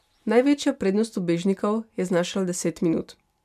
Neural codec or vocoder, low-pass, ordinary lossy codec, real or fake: none; 14.4 kHz; MP3, 96 kbps; real